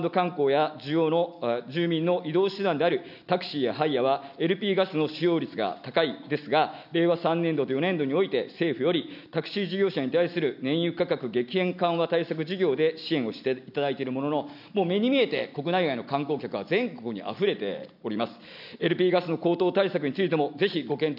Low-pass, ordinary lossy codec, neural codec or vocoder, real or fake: 5.4 kHz; none; none; real